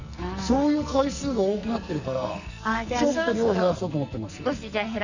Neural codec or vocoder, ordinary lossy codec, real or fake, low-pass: codec, 44.1 kHz, 2.6 kbps, SNAC; none; fake; 7.2 kHz